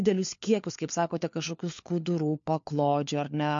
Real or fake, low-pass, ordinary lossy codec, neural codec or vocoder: fake; 7.2 kHz; MP3, 48 kbps; codec, 16 kHz, 4 kbps, FunCodec, trained on LibriTTS, 50 frames a second